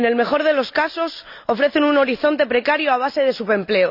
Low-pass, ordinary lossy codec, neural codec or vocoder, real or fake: 5.4 kHz; none; none; real